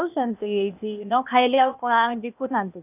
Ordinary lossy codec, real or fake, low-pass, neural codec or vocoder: none; fake; 3.6 kHz; codec, 16 kHz, 0.8 kbps, ZipCodec